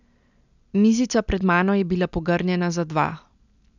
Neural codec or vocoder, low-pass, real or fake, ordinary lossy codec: none; 7.2 kHz; real; none